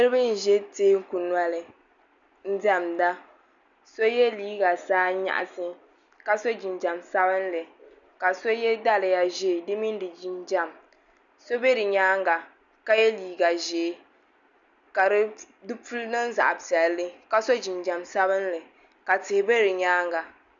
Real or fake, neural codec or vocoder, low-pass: real; none; 7.2 kHz